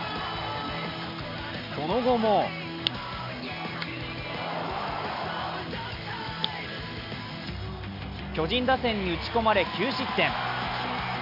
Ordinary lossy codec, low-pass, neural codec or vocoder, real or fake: none; 5.4 kHz; none; real